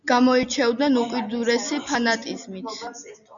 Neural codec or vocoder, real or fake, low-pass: none; real; 7.2 kHz